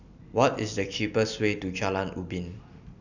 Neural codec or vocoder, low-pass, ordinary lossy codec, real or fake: none; 7.2 kHz; none; real